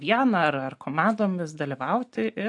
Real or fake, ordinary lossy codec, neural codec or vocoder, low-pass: real; AAC, 64 kbps; none; 10.8 kHz